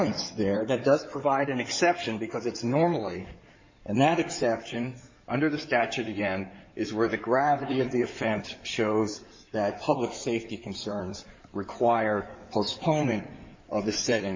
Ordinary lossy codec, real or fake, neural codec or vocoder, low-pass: MP3, 48 kbps; fake; codec, 16 kHz in and 24 kHz out, 2.2 kbps, FireRedTTS-2 codec; 7.2 kHz